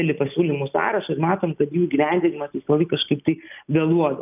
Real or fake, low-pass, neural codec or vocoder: real; 3.6 kHz; none